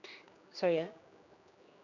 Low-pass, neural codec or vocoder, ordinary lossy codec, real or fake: 7.2 kHz; codec, 16 kHz, 2 kbps, X-Codec, HuBERT features, trained on general audio; none; fake